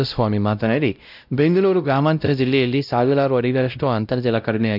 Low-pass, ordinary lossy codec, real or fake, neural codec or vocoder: 5.4 kHz; none; fake; codec, 16 kHz, 0.5 kbps, X-Codec, WavLM features, trained on Multilingual LibriSpeech